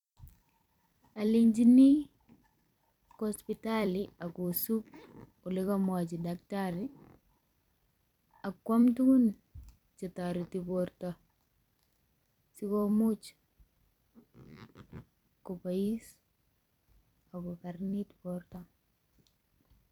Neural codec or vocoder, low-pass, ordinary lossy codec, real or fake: vocoder, 44.1 kHz, 128 mel bands every 256 samples, BigVGAN v2; 19.8 kHz; none; fake